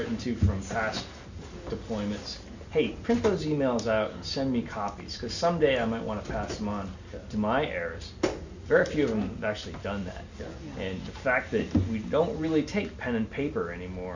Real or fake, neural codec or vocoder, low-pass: real; none; 7.2 kHz